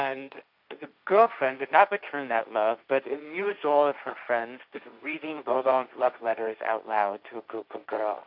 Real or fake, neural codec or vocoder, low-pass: fake; codec, 16 kHz, 1.1 kbps, Voila-Tokenizer; 5.4 kHz